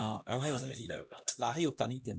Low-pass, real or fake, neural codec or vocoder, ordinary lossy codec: none; fake; codec, 16 kHz, 1 kbps, X-Codec, HuBERT features, trained on LibriSpeech; none